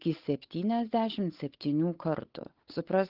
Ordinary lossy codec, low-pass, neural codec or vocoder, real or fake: Opus, 16 kbps; 5.4 kHz; codec, 16 kHz, 8 kbps, FunCodec, trained on Chinese and English, 25 frames a second; fake